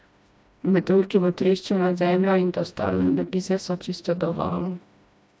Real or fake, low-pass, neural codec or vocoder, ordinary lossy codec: fake; none; codec, 16 kHz, 1 kbps, FreqCodec, smaller model; none